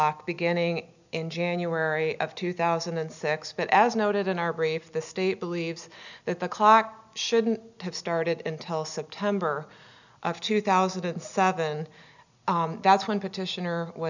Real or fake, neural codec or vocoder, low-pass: real; none; 7.2 kHz